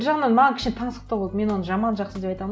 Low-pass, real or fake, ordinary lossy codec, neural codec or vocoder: none; real; none; none